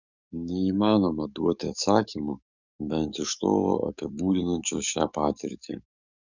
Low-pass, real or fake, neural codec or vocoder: 7.2 kHz; fake; codec, 44.1 kHz, 7.8 kbps, DAC